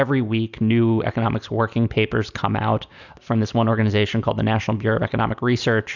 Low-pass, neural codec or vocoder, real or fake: 7.2 kHz; none; real